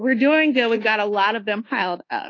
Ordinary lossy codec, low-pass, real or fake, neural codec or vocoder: AAC, 32 kbps; 7.2 kHz; fake; codec, 24 kHz, 1.2 kbps, DualCodec